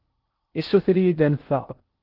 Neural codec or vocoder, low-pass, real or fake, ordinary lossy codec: codec, 16 kHz in and 24 kHz out, 0.6 kbps, FocalCodec, streaming, 2048 codes; 5.4 kHz; fake; Opus, 16 kbps